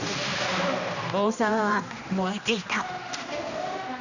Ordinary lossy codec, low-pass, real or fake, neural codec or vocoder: none; 7.2 kHz; fake; codec, 16 kHz, 1 kbps, X-Codec, HuBERT features, trained on general audio